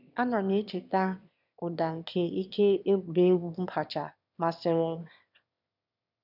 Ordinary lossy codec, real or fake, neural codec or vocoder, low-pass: none; fake; autoencoder, 22.05 kHz, a latent of 192 numbers a frame, VITS, trained on one speaker; 5.4 kHz